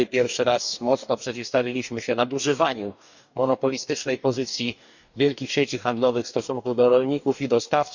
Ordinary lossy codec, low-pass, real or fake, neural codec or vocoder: none; 7.2 kHz; fake; codec, 44.1 kHz, 2.6 kbps, DAC